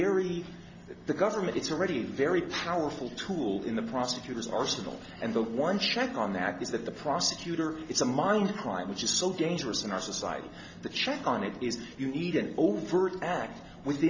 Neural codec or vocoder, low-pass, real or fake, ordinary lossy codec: none; 7.2 kHz; real; MP3, 48 kbps